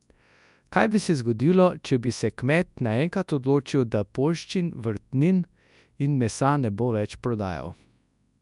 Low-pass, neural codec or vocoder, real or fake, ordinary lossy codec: 10.8 kHz; codec, 24 kHz, 0.9 kbps, WavTokenizer, large speech release; fake; none